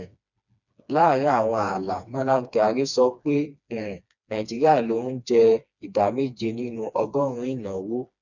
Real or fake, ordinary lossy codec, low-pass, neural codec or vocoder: fake; none; 7.2 kHz; codec, 16 kHz, 2 kbps, FreqCodec, smaller model